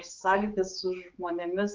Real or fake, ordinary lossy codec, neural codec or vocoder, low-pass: fake; Opus, 32 kbps; codec, 16 kHz, 4 kbps, X-Codec, HuBERT features, trained on general audio; 7.2 kHz